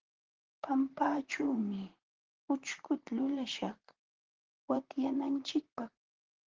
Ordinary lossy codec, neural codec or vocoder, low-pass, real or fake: Opus, 16 kbps; vocoder, 44.1 kHz, 128 mel bands, Pupu-Vocoder; 7.2 kHz; fake